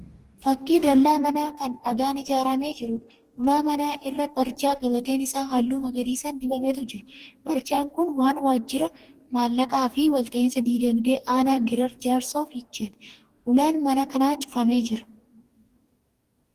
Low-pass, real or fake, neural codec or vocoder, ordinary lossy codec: 14.4 kHz; fake; codec, 44.1 kHz, 2.6 kbps, DAC; Opus, 24 kbps